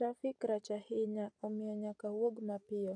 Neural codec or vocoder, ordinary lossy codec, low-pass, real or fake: none; AAC, 64 kbps; 9.9 kHz; real